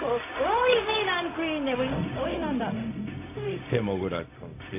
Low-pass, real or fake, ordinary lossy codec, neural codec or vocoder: 3.6 kHz; fake; AAC, 32 kbps; codec, 16 kHz, 0.4 kbps, LongCat-Audio-Codec